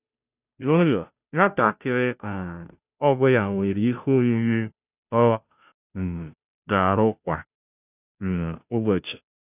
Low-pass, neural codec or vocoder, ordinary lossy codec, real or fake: 3.6 kHz; codec, 16 kHz, 0.5 kbps, FunCodec, trained on Chinese and English, 25 frames a second; none; fake